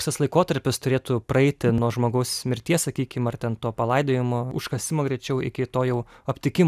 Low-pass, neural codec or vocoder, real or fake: 14.4 kHz; none; real